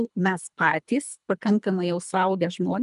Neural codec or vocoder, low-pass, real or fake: codec, 24 kHz, 3 kbps, HILCodec; 10.8 kHz; fake